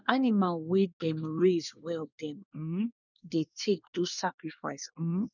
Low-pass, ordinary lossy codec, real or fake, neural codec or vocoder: 7.2 kHz; none; fake; codec, 24 kHz, 1 kbps, SNAC